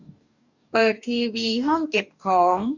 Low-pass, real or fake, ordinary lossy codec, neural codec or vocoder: 7.2 kHz; fake; none; codec, 44.1 kHz, 2.6 kbps, DAC